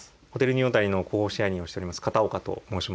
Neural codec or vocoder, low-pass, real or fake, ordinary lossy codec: none; none; real; none